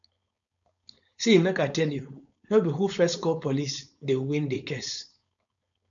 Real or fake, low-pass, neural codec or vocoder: fake; 7.2 kHz; codec, 16 kHz, 4.8 kbps, FACodec